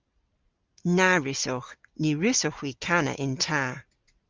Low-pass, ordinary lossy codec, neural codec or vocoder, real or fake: 7.2 kHz; Opus, 24 kbps; none; real